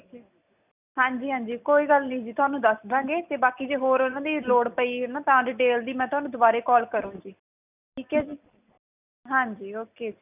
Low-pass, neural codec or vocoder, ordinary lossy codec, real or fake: 3.6 kHz; none; none; real